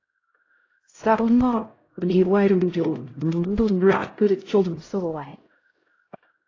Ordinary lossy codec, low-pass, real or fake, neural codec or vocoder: AAC, 32 kbps; 7.2 kHz; fake; codec, 16 kHz, 0.5 kbps, X-Codec, HuBERT features, trained on LibriSpeech